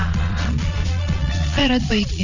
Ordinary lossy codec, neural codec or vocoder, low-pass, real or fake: none; vocoder, 22.05 kHz, 80 mel bands, Vocos; 7.2 kHz; fake